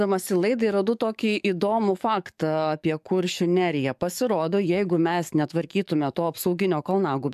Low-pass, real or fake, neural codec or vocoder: 14.4 kHz; fake; codec, 44.1 kHz, 7.8 kbps, DAC